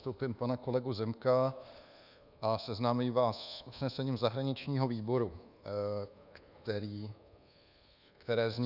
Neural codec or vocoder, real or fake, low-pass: codec, 24 kHz, 1.2 kbps, DualCodec; fake; 5.4 kHz